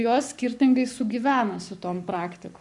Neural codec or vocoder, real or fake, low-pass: codec, 44.1 kHz, 7.8 kbps, Pupu-Codec; fake; 10.8 kHz